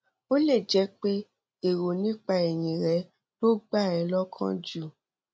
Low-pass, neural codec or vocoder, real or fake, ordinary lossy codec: none; none; real; none